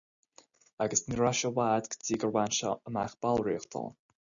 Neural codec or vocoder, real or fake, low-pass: none; real; 7.2 kHz